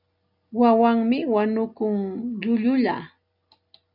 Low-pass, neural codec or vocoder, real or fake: 5.4 kHz; none; real